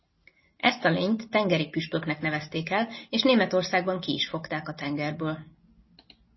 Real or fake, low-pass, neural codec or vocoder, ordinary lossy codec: real; 7.2 kHz; none; MP3, 24 kbps